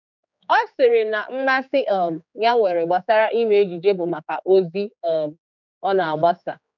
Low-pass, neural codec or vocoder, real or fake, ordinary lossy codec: 7.2 kHz; codec, 16 kHz, 4 kbps, X-Codec, HuBERT features, trained on general audio; fake; none